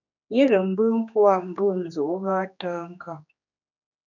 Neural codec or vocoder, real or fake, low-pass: codec, 16 kHz, 2 kbps, X-Codec, HuBERT features, trained on general audio; fake; 7.2 kHz